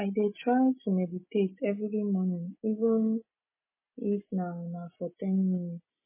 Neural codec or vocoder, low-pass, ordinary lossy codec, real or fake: none; 3.6 kHz; MP3, 16 kbps; real